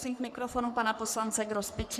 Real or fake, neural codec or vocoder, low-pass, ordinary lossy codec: fake; codec, 44.1 kHz, 3.4 kbps, Pupu-Codec; 14.4 kHz; Opus, 64 kbps